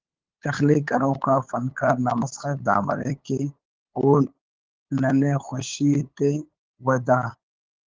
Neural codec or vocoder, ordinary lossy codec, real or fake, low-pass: codec, 16 kHz, 8 kbps, FunCodec, trained on LibriTTS, 25 frames a second; Opus, 16 kbps; fake; 7.2 kHz